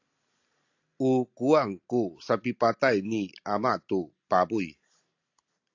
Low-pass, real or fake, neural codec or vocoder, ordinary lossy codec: 7.2 kHz; real; none; AAC, 48 kbps